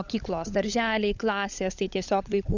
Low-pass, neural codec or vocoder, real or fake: 7.2 kHz; codec, 16 kHz, 16 kbps, FunCodec, trained on LibriTTS, 50 frames a second; fake